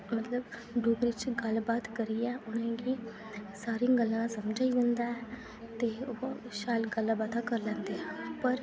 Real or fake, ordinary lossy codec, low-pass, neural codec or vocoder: real; none; none; none